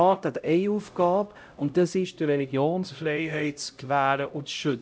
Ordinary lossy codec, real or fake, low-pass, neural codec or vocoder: none; fake; none; codec, 16 kHz, 0.5 kbps, X-Codec, HuBERT features, trained on LibriSpeech